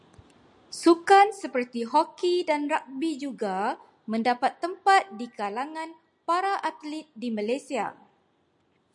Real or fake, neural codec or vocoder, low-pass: real; none; 10.8 kHz